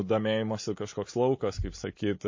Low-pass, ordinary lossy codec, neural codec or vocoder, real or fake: 7.2 kHz; MP3, 32 kbps; none; real